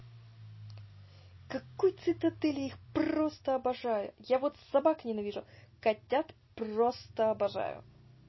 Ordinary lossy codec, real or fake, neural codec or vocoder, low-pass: MP3, 24 kbps; real; none; 7.2 kHz